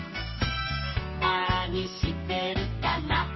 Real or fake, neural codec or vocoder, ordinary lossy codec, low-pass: fake; codec, 32 kHz, 1.9 kbps, SNAC; MP3, 24 kbps; 7.2 kHz